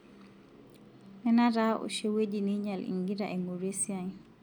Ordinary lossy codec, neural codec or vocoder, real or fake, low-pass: none; none; real; 19.8 kHz